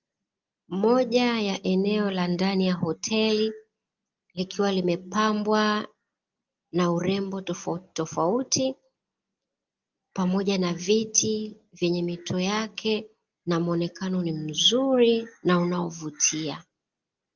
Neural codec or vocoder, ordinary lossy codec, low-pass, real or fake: none; Opus, 24 kbps; 7.2 kHz; real